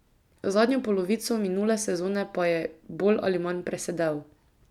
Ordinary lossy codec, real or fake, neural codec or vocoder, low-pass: none; real; none; 19.8 kHz